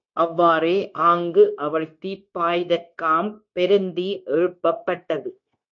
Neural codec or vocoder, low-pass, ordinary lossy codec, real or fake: codec, 16 kHz, 0.9 kbps, LongCat-Audio-Codec; 7.2 kHz; MP3, 48 kbps; fake